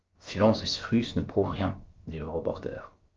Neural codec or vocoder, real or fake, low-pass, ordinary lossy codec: codec, 16 kHz, about 1 kbps, DyCAST, with the encoder's durations; fake; 7.2 kHz; Opus, 24 kbps